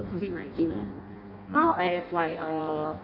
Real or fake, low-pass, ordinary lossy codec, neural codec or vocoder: fake; 5.4 kHz; none; codec, 16 kHz in and 24 kHz out, 0.6 kbps, FireRedTTS-2 codec